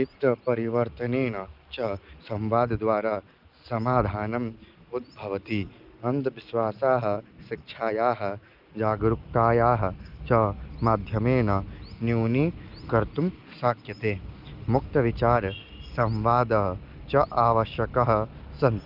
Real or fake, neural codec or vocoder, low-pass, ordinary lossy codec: real; none; 5.4 kHz; Opus, 32 kbps